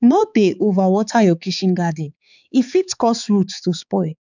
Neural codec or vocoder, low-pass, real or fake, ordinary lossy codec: codec, 16 kHz, 4 kbps, X-Codec, HuBERT features, trained on balanced general audio; 7.2 kHz; fake; none